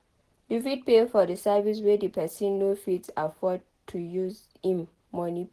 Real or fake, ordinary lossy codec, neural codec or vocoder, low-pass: real; Opus, 16 kbps; none; 14.4 kHz